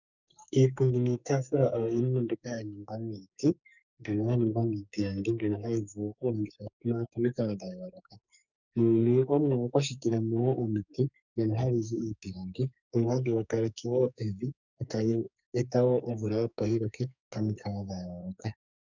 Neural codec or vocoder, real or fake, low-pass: codec, 44.1 kHz, 2.6 kbps, SNAC; fake; 7.2 kHz